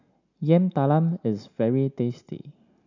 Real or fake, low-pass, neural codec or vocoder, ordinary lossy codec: real; 7.2 kHz; none; none